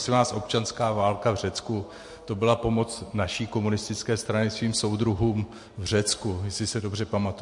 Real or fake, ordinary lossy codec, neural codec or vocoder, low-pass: real; MP3, 48 kbps; none; 10.8 kHz